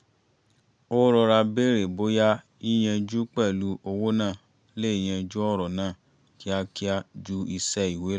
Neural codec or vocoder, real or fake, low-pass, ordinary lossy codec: none; real; 9.9 kHz; none